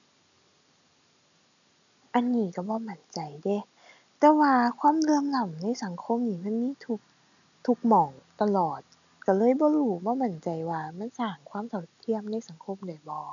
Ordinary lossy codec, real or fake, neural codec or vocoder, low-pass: none; real; none; 7.2 kHz